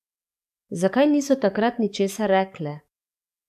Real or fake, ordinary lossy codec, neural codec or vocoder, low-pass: fake; none; codec, 44.1 kHz, 7.8 kbps, DAC; 14.4 kHz